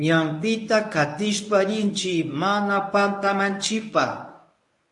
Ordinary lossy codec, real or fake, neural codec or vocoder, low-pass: AAC, 64 kbps; fake; codec, 24 kHz, 0.9 kbps, WavTokenizer, medium speech release version 1; 10.8 kHz